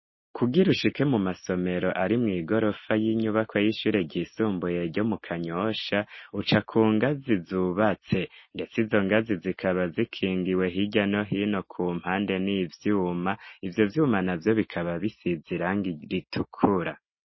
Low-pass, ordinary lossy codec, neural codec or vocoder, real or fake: 7.2 kHz; MP3, 24 kbps; none; real